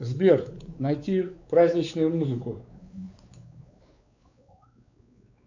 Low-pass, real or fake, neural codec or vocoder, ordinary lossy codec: 7.2 kHz; fake; codec, 16 kHz, 4 kbps, X-Codec, WavLM features, trained on Multilingual LibriSpeech; AAC, 48 kbps